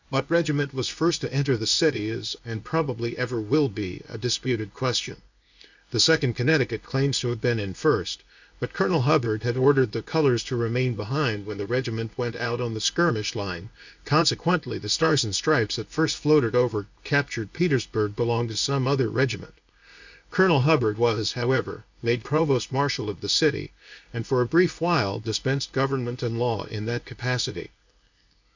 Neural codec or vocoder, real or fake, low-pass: codec, 16 kHz, 0.8 kbps, ZipCodec; fake; 7.2 kHz